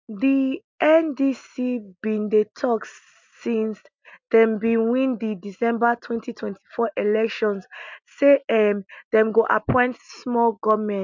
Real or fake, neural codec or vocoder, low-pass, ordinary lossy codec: real; none; 7.2 kHz; MP3, 64 kbps